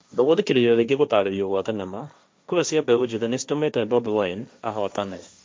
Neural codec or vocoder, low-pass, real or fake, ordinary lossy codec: codec, 16 kHz, 1.1 kbps, Voila-Tokenizer; none; fake; none